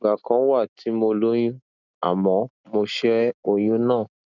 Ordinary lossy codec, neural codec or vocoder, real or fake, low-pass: none; none; real; 7.2 kHz